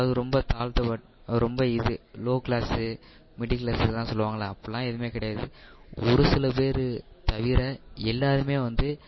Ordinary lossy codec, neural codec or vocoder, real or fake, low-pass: MP3, 24 kbps; none; real; 7.2 kHz